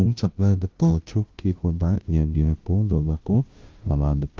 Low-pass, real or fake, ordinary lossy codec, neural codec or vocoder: 7.2 kHz; fake; Opus, 24 kbps; codec, 16 kHz, 0.5 kbps, FunCodec, trained on Chinese and English, 25 frames a second